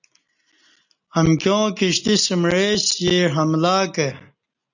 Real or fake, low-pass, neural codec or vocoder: real; 7.2 kHz; none